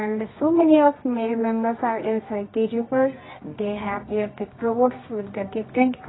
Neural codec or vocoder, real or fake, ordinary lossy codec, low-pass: codec, 24 kHz, 0.9 kbps, WavTokenizer, medium music audio release; fake; AAC, 16 kbps; 7.2 kHz